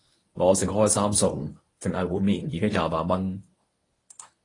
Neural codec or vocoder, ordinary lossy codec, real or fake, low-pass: codec, 24 kHz, 0.9 kbps, WavTokenizer, medium speech release version 1; AAC, 32 kbps; fake; 10.8 kHz